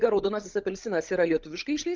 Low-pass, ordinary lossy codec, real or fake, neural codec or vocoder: 7.2 kHz; Opus, 24 kbps; fake; codec, 16 kHz, 16 kbps, FunCodec, trained on LibriTTS, 50 frames a second